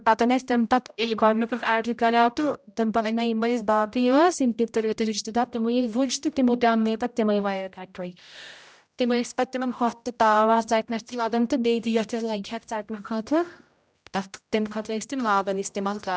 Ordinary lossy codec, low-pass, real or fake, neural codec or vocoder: none; none; fake; codec, 16 kHz, 0.5 kbps, X-Codec, HuBERT features, trained on general audio